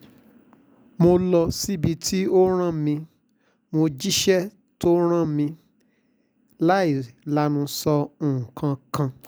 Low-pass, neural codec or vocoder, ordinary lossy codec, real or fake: none; none; none; real